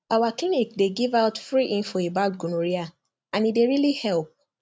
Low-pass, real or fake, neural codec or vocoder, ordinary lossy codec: none; real; none; none